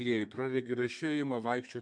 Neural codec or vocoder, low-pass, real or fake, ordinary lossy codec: codec, 32 kHz, 1.9 kbps, SNAC; 9.9 kHz; fake; MP3, 64 kbps